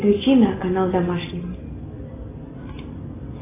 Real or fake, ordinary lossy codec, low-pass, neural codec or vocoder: real; AAC, 16 kbps; 3.6 kHz; none